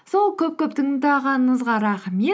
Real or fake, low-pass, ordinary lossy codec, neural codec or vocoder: real; none; none; none